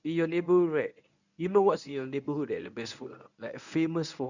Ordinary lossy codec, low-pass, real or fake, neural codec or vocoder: none; 7.2 kHz; fake; codec, 24 kHz, 0.9 kbps, WavTokenizer, medium speech release version 1